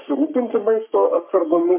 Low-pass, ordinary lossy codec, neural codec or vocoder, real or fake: 3.6 kHz; MP3, 32 kbps; codec, 44.1 kHz, 3.4 kbps, Pupu-Codec; fake